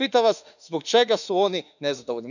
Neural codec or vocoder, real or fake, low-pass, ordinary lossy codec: autoencoder, 48 kHz, 32 numbers a frame, DAC-VAE, trained on Japanese speech; fake; 7.2 kHz; none